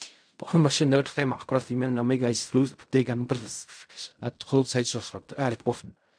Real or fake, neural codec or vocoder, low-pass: fake; codec, 16 kHz in and 24 kHz out, 0.4 kbps, LongCat-Audio-Codec, fine tuned four codebook decoder; 9.9 kHz